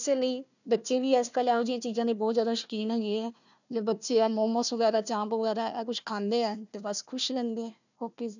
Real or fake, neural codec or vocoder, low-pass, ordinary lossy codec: fake; codec, 16 kHz, 1 kbps, FunCodec, trained on Chinese and English, 50 frames a second; 7.2 kHz; none